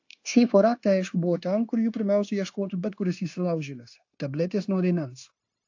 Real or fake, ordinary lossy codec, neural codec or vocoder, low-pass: fake; AAC, 48 kbps; codec, 16 kHz, 0.9 kbps, LongCat-Audio-Codec; 7.2 kHz